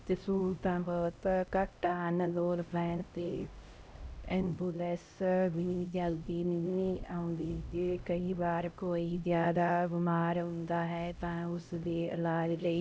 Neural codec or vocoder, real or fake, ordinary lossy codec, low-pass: codec, 16 kHz, 0.5 kbps, X-Codec, HuBERT features, trained on LibriSpeech; fake; none; none